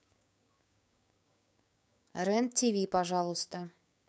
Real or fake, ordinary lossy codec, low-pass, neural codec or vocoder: fake; none; none; codec, 16 kHz, 6 kbps, DAC